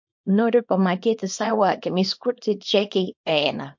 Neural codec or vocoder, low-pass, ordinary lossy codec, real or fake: codec, 24 kHz, 0.9 kbps, WavTokenizer, small release; 7.2 kHz; MP3, 48 kbps; fake